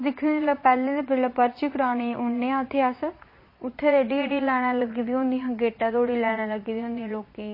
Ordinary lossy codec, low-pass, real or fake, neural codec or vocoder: MP3, 24 kbps; 5.4 kHz; fake; vocoder, 22.05 kHz, 80 mel bands, Vocos